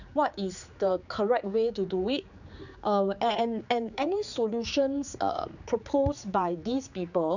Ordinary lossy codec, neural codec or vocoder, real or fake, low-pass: none; codec, 16 kHz, 4 kbps, X-Codec, HuBERT features, trained on general audio; fake; 7.2 kHz